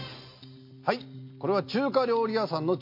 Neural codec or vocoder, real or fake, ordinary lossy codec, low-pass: none; real; MP3, 32 kbps; 5.4 kHz